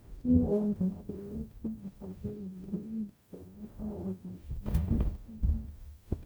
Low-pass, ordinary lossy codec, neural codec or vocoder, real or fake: none; none; codec, 44.1 kHz, 0.9 kbps, DAC; fake